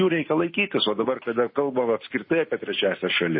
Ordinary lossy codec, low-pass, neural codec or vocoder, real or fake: MP3, 24 kbps; 7.2 kHz; none; real